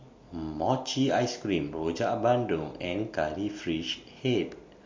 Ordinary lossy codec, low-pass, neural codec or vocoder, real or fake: MP3, 48 kbps; 7.2 kHz; none; real